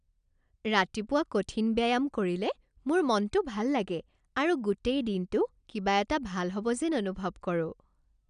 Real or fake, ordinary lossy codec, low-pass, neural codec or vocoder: real; none; 9.9 kHz; none